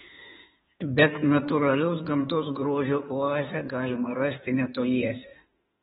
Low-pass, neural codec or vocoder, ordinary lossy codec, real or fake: 19.8 kHz; autoencoder, 48 kHz, 32 numbers a frame, DAC-VAE, trained on Japanese speech; AAC, 16 kbps; fake